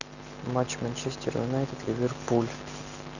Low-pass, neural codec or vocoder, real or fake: 7.2 kHz; none; real